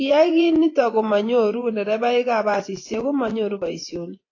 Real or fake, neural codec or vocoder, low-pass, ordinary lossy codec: fake; vocoder, 24 kHz, 100 mel bands, Vocos; 7.2 kHz; AAC, 32 kbps